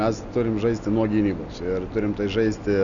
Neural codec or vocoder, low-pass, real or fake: none; 7.2 kHz; real